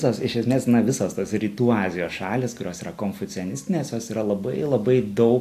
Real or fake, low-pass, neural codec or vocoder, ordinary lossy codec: real; 14.4 kHz; none; AAC, 64 kbps